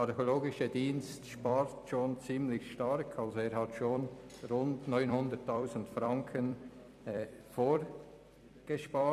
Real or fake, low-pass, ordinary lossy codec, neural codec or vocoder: fake; 14.4 kHz; none; vocoder, 44.1 kHz, 128 mel bands every 256 samples, BigVGAN v2